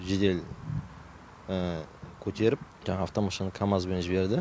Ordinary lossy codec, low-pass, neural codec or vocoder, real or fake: none; none; none; real